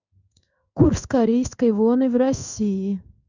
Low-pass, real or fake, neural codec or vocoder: 7.2 kHz; fake; codec, 16 kHz in and 24 kHz out, 1 kbps, XY-Tokenizer